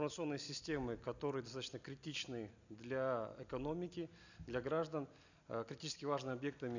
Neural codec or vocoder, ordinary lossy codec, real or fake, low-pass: none; none; real; 7.2 kHz